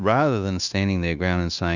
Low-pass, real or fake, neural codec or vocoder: 7.2 kHz; fake; codec, 16 kHz, 0.9 kbps, LongCat-Audio-Codec